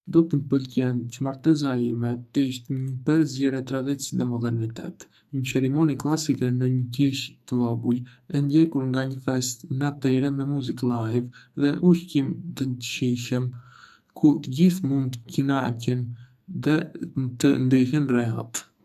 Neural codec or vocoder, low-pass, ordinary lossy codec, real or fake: codec, 44.1 kHz, 2.6 kbps, SNAC; 14.4 kHz; none; fake